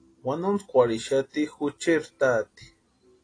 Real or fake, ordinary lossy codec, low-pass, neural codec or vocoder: real; AAC, 32 kbps; 9.9 kHz; none